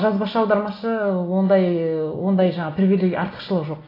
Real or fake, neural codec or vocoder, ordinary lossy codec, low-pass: real; none; AAC, 24 kbps; 5.4 kHz